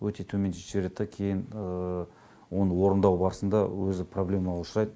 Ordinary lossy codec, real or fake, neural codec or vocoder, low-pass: none; real; none; none